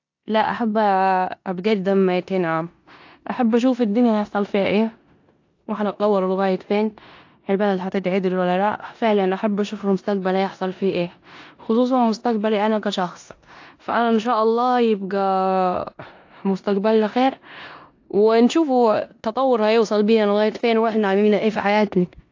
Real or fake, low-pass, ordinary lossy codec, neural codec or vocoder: fake; 7.2 kHz; AAC, 48 kbps; codec, 16 kHz in and 24 kHz out, 0.9 kbps, LongCat-Audio-Codec, four codebook decoder